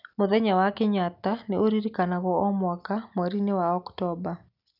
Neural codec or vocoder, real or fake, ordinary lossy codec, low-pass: none; real; none; 5.4 kHz